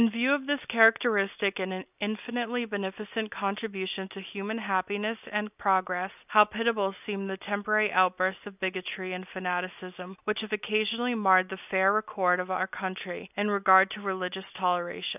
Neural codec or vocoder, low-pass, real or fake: none; 3.6 kHz; real